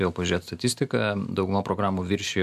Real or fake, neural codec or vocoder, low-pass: real; none; 14.4 kHz